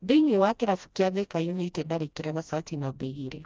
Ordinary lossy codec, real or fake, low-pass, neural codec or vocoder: none; fake; none; codec, 16 kHz, 1 kbps, FreqCodec, smaller model